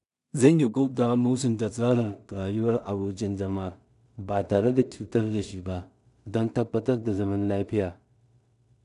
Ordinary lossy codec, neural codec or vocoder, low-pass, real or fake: none; codec, 16 kHz in and 24 kHz out, 0.4 kbps, LongCat-Audio-Codec, two codebook decoder; 10.8 kHz; fake